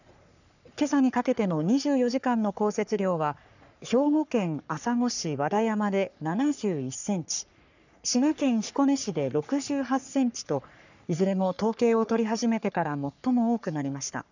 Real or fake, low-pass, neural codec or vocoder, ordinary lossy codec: fake; 7.2 kHz; codec, 44.1 kHz, 3.4 kbps, Pupu-Codec; none